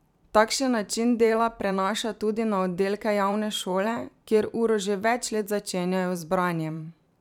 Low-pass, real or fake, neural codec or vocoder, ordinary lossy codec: 19.8 kHz; fake; vocoder, 44.1 kHz, 128 mel bands every 512 samples, BigVGAN v2; none